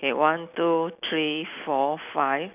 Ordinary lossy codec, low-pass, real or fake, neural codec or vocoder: none; 3.6 kHz; real; none